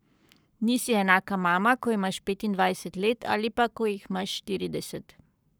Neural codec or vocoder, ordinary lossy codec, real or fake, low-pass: codec, 44.1 kHz, 7.8 kbps, Pupu-Codec; none; fake; none